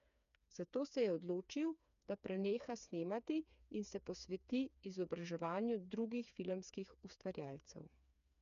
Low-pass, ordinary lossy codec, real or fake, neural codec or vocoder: 7.2 kHz; none; fake; codec, 16 kHz, 4 kbps, FreqCodec, smaller model